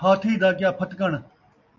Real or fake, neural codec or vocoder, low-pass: real; none; 7.2 kHz